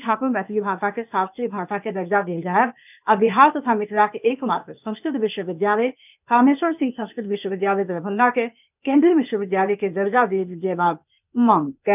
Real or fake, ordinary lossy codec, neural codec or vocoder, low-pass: fake; none; codec, 16 kHz, 0.8 kbps, ZipCodec; 3.6 kHz